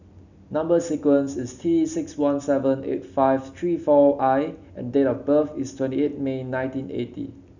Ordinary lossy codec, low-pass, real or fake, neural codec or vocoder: none; 7.2 kHz; real; none